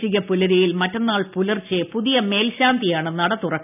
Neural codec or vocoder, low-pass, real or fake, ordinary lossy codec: none; 3.6 kHz; real; none